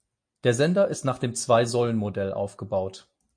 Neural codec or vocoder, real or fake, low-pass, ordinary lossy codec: none; real; 9.9 kHz; MP3, 48 kbps